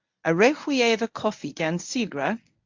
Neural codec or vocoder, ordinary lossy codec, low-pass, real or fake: codec, 24 kHz, 0.9 kbps, WavTokenizer, medium speech release version 1; AAC, 48 kbps; 7.2 kHz; fake